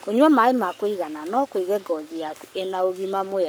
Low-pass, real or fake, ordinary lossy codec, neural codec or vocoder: none; fake; none; codec, 44.1 kHz, 7.8 kbps, Pupu-Codec